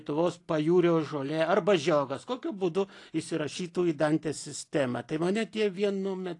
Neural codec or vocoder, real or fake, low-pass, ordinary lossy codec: none; real; 10.8 kHz; AAC, 48 kbps